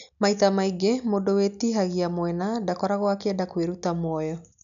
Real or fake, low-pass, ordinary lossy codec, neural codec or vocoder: real; 7.2 kHz; none; none